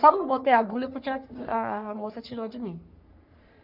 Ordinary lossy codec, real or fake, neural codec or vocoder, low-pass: none; fake; codec, 44.1 kHz, 3.4 kbps, Pupu-Codec; 5.4 kHz